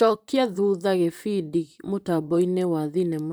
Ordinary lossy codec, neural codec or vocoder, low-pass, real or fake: none; vocoder, 44.1 kHz, 128 mel bands, Pupu-Vocoder; none; fake